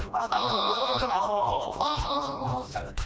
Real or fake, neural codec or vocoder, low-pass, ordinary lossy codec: fake; codec, 16 kHz, 1 kbps, FreqCodec, smaller model; none; none